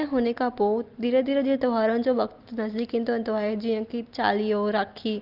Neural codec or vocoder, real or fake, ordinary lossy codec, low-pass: none; real; Opus, 32 kbps; 5.4 kHz